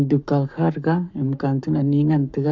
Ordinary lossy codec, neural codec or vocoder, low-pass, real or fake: MP3, 64 kbps; codec, 44.1 kHz, 7.8 kbps, Pupu-Codec; 7.2 kHz; fake